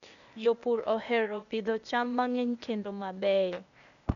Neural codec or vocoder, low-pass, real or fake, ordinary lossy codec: codec, 16 kHz, 0.8 kbps, ZipCodec; 7.2 kHz; fake; none